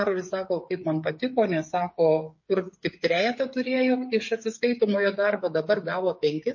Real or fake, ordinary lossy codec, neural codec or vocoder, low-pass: fake; MP3, 32 kbps; codec, 16 kHz, 16 kbps, FreqCodec, smaller model; 7.2 kHz